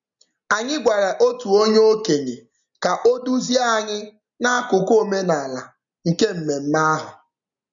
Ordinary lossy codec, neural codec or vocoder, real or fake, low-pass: none; none; real; 7.2 kHz